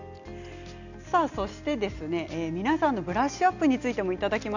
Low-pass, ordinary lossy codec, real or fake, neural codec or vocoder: 7.2 kHz; none; real; none